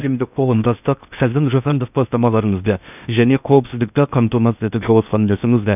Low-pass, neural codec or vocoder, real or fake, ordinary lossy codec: 3.6 kHz; codec, 16 kHz in and 24 kHz out, 0.6 kbps, FocalCodec, streaming, 2048 codes; fake; none